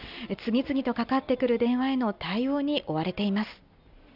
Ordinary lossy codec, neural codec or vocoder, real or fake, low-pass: none; none; real; 5.4 kHz